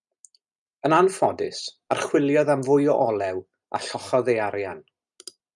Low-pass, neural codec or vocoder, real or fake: 10.8 kHz; none; real